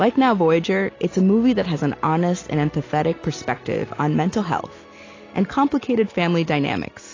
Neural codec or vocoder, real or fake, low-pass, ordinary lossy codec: none; real; 7.2 kHz; AAC, 32 kbps